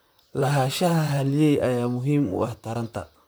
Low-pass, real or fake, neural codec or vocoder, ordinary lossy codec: none; fake; vocoder, 44.1 kHz, 128 mel bands, Pupu-Vocoder; none